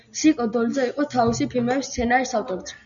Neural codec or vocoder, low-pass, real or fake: none; 7.2 kHz; real